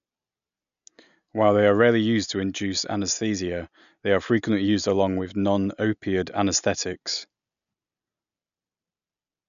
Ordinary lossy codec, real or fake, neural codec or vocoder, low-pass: none; real; none; 7.2 kHz